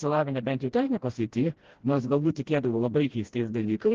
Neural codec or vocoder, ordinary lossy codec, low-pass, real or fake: codec, 16 kHz, 1 kbps, FreqCodec, smaller model; Opus, 16 kbps; 7.2 kHz; fake